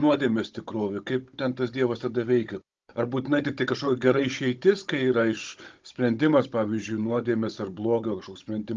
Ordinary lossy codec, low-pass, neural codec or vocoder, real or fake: Opus, 24 kbps; 7.2 kHz; codec, 16 kHz, 16 kbps, FunCodec, trained on Chinese and English, 50 frames a second; fake